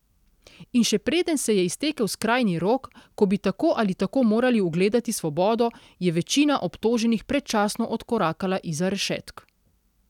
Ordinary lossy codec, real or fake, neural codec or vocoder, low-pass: none; real; none; 19.8 kHz